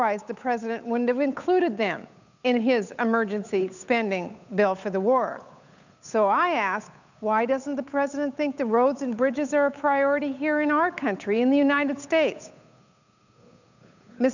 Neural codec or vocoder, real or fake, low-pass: codec, 16 kHz, 8 kbps, FunCodec, trained on Chinese and English, 25 frames a second; fake; 7.2 kHz